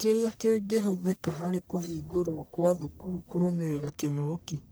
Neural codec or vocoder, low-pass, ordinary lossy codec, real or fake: codec, 44.1 kHz, 1.7 kbps, Pupu-Codec; none; none; fake